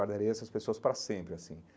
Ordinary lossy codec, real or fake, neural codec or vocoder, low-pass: none; real; none; none